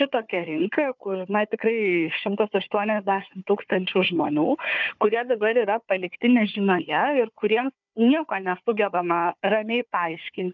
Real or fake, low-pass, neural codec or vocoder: fake; 7.2 kHz; codec, 16 kHz, 4 kbps, FunCodec, trained on Chinese and English, 50 frames a second